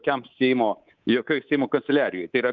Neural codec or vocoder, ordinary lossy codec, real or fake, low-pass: codec, 24 kHz, 3.1 kbps, DualCodec; Opus, 32 kbps; fake; 7.2 kHz